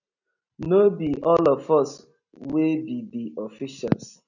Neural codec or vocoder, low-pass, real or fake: none; 7.2 kHz; real